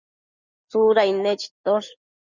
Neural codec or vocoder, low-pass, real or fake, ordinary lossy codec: none; 7.2 kHz; real; Opus, 64 kbps